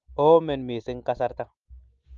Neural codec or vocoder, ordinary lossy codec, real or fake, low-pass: none; Opus, 32 kbps; real; 7.2 kHz